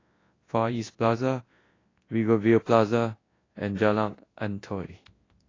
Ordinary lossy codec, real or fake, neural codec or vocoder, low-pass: AAC, 32 kbps; fake; codec, 24 kHz, 0.9 kbps, WavTokenizer, large speech release; 7.2 kHz